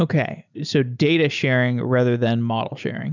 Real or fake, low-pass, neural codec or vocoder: real; 7.2 kHz; none